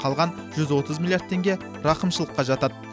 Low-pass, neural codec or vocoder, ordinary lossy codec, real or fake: none; none; none; real